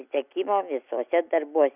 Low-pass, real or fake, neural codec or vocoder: 3.6 kHz; real; none